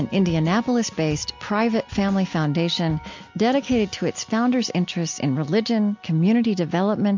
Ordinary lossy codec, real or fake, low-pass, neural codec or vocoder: MP3, 48 kbps; real; 7.2 kHz; none